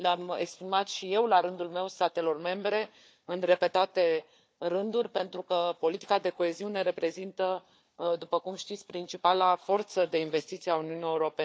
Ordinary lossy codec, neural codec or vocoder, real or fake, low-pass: none; codec, 16 kHz, 4 kbps, FunCodec, trained on Chinese and English, 50 frames a second; fake; none